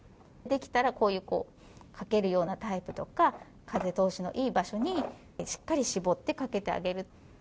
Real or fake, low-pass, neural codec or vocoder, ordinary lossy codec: real; none; none; none